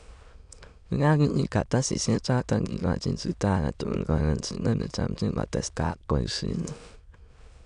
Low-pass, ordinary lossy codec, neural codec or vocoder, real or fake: 9.9 kHz; none; autoencoder, 22.05 kHz, a latent of 192 numbers a frame, VITS, trained on many speakers; fake